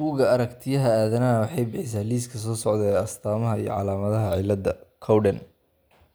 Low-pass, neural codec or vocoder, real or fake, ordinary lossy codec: none; none; real; none